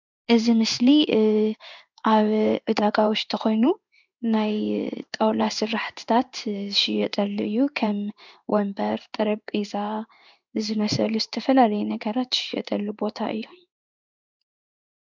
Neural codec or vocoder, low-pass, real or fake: codec, 16 kHz in and 24 kHz out, 1 kbps, XY-Tokenizer; 7.2 kHz; fake